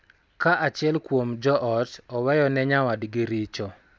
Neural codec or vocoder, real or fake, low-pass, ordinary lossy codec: none; real; none; none